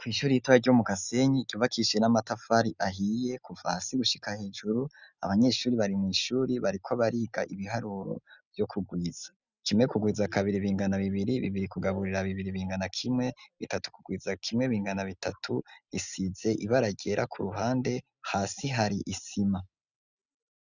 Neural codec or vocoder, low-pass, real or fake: none; 7.2 kHz; real